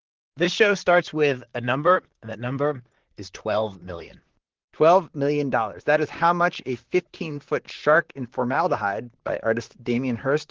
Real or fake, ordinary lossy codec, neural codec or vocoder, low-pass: fake; Opus, 16 kbps; vocoder, 44.1 kHz, 128 mel bands, Pupu-Vocoder; 7.2 kHz